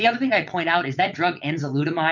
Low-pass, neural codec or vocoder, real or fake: 7.2 kHz; vocoder, 22.05 kHz, 80 mel bands, WaveNeXt; fake